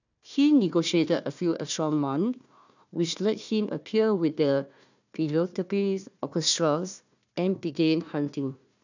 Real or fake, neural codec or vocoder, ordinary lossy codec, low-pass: fake; codec, 16 kHz, 1 kbps, FunCodec, trained on Chinese and English, 50 frames a second; none; 7.2 kHz